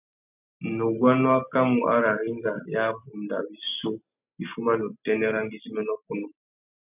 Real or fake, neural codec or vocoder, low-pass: real; none; 3.6 kHz